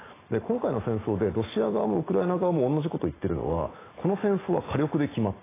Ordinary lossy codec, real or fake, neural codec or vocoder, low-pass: AAC, 16 kbps; real; none; 3.6 kHz